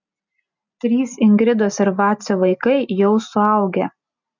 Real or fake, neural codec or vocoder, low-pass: real; none; 7.2 kHz